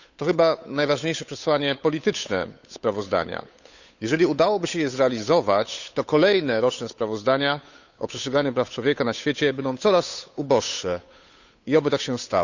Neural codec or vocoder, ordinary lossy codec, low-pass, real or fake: codec, 16 kHz, 8 kbps, FunCodec, trained on Chinese and English, 25 frames a second; none; 7.2 kHz; fake